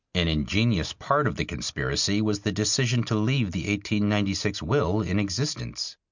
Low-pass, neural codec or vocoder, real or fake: 7.2 kHz; none; real